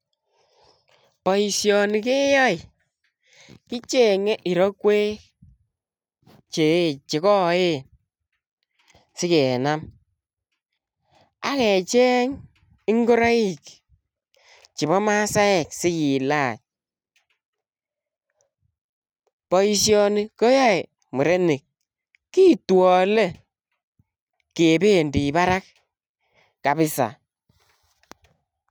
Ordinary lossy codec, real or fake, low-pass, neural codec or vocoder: none; real; none; none